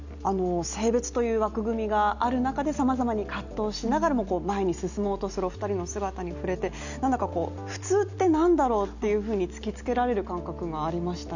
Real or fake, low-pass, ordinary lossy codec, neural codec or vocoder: real; 7.2 kHz; none; none